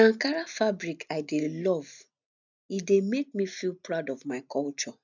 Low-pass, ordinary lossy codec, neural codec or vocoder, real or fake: 7.2 kHz; none; none; real